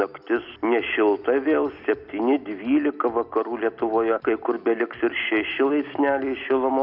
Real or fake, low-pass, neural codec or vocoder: real; 5.4 kHz; none